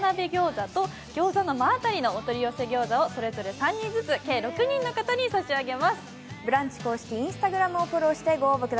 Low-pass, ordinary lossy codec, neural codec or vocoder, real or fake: none; none; none; real